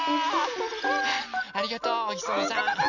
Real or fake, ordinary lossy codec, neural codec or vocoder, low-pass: real; none; none; 7.2 kHz